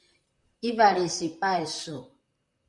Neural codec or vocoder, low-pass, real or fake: vocoder, 44.1 kHz, 128 mel bands, Pupu-Vocoder; 10.8 kHz; fake